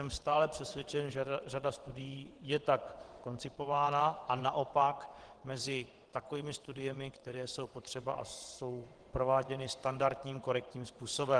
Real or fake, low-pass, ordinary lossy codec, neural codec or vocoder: fake; 9.9 kHz; Opus, 16 kbps; vocoder, 22.05 kHz, 80 mel bands, WaveNeXt